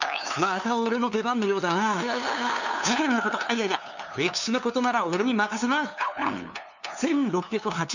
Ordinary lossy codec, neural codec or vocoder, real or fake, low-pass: none; codec, 16 kHz, 2 kbps, FunCodec, trained on LibriTTS, 25 frames a second; fake; 7.2 kHz